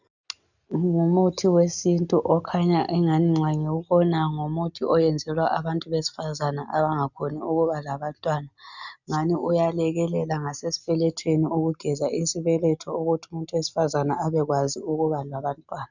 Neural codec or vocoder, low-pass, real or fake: none; 7.2 kHz; real